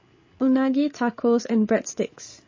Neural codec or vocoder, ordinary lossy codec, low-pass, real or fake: codec, 16 kHz, 8 kbps, FreqCodec, larger model; MP3, 32 kbps; 7.2 kHz; fake